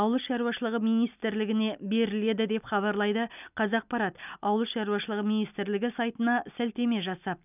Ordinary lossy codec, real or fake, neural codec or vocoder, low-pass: none; real; none; 3.6 kHz